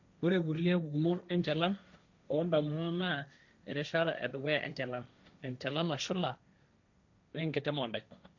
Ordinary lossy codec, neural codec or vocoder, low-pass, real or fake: none; codec, 16 kHz, 1.1 kbps, Voila-Tokenizer; 7.2 kHz; fake